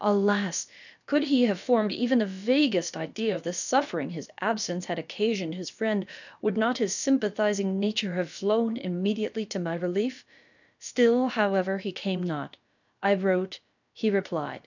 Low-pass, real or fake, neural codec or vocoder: 7.2 kHz; fake; codec, 16 kHz, about 1 kbps, DyCAST, with the encoder's durations